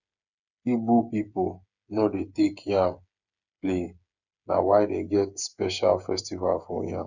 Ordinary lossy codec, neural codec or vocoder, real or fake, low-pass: none; codec, 16 kHz, 8 kbps, FreqCodec, smaller model; fake; 7.2 kHz